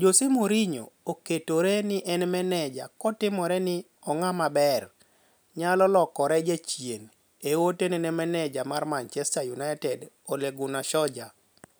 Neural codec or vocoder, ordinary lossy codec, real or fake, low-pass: none; none; real; none